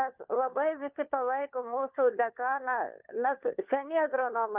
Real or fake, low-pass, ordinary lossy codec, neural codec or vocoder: fake; 3.6 kHz; Opus, 24 kbps; codec, 16 kHz, 4 kbps, FunCodec, trained on LibriTTS, 50 frames a second